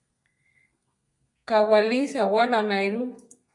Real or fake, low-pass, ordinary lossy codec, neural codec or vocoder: fake; 10.8 kHz; MP3, 64 kbps; codec, 32 kHz, 1.9 kbps, SNAC